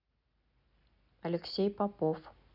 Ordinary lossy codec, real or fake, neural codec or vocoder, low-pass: none; real; none; 5.4 kHz